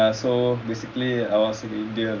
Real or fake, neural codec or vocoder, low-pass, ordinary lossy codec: real; none; 7.2 kHz; MP3, 64 kbps